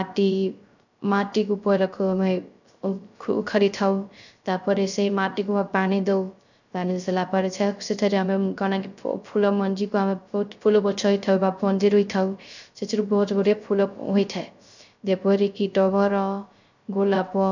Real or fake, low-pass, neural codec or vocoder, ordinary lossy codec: fake; 7.2 kHz; codec, 16 kHz, 0.3 kbps, FocalCodec; none